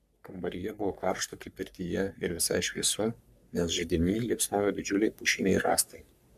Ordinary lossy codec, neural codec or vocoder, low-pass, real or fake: MP3, 96 kbps; codec, 44.1 kHz, 3.4 kbps, Pupu-Codec; 14.4 kHz; fake